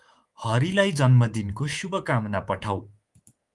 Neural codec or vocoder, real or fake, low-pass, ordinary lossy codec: codec, 24 kHz, 3.1 kbps, DualCodec; fake; 10.8 kHz; Opus, 24 kbps